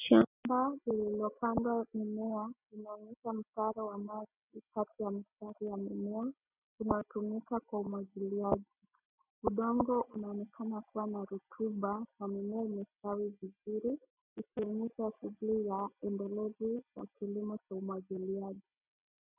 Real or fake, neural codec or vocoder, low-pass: real; none; 3.6 kHz